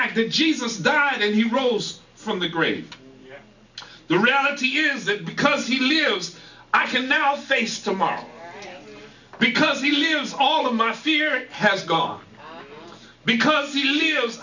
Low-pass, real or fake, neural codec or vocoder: 7.2 kHz; real; none